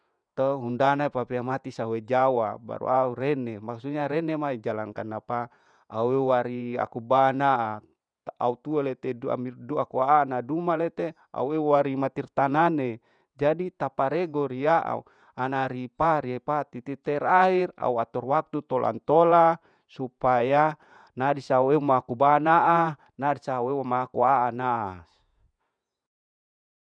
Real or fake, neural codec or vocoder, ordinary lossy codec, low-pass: fake; vocoder, 48 kHz, 128 mel bands, Vocos; none; 9.9 kHz